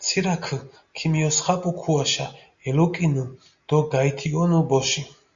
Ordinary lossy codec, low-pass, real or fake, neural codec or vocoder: Opus, 64 kbps; 7.2 kHz; real; none